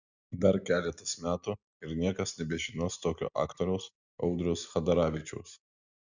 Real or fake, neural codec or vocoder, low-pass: real; none; 7.2 kHz